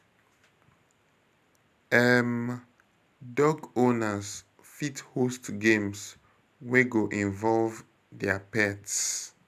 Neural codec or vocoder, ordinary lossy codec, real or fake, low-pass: none; none; real; 14.4 kHz